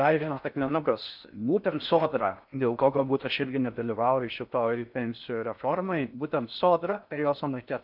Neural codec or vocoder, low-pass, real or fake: codec, 16 kHz in and 24 kHz out, 0.6 kbps, FocalCodec, streaming, 4096 codes; 5.4 kHz; fake